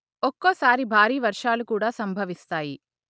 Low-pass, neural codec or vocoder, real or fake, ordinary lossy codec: none; none; real; none